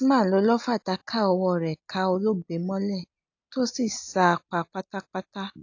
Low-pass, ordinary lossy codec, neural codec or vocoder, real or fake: 7.2 kHz; AAC, 48 kbps; none; real